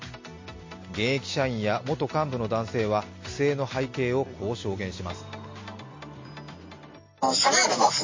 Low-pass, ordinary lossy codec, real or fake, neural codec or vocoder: 7.2 kHz; MP3, 32 kbps; real; none